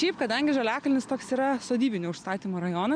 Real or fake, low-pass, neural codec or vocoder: real; 9.9 kHz; none